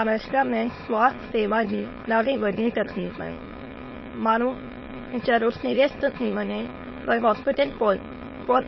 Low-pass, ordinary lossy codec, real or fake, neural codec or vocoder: 7.2 kHz; MP3, 24 kbps; fake; autoencoder, 22.05 kHz, a latent of 192 numbers a frame, VITS, trained on many speakers